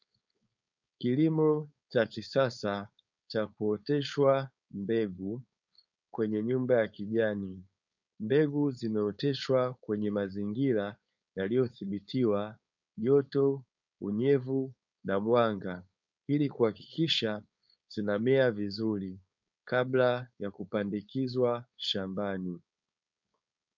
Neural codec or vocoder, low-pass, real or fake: codec, 16 kHz, 4.8 kbps, FACodec; 7.2 kHz; fake